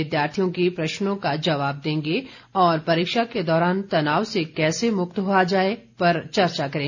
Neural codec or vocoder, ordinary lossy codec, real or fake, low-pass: none; none; real; 7.2 kHz